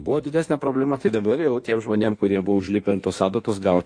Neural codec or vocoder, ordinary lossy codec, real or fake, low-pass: codec, 16 kHz in and 24 kHz out, 1.1 kbps, FireRedTTS-2 codec; AAC, 48 kbps; fake; 9.9 kHz